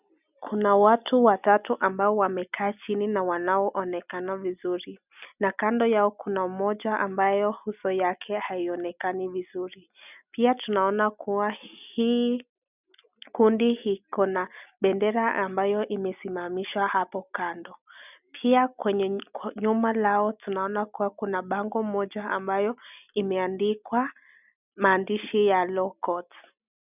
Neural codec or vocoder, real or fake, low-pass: none; real; 3.6 kHz